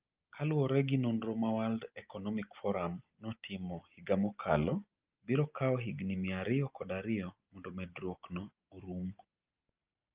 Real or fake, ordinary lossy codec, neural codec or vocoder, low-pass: real; Opus, 32 kbps; none; 3.6 kHz